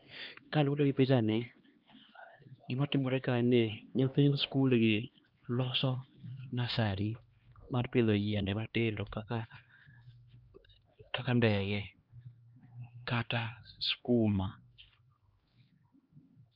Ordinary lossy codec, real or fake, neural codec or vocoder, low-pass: Opus, 24 kbps; fake; codec, 16 kHz, 2 kbps, X-Codec, HuBERT features, trained on LibriSpeech; 5.4 kHz